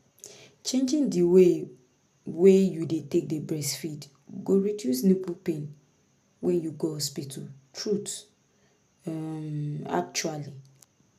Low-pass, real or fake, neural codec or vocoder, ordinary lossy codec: 14.4 kHz; real; none; none